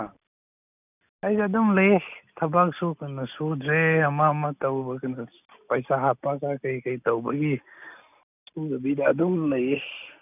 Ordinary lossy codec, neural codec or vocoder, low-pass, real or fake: none; none; 3.6 kHz; real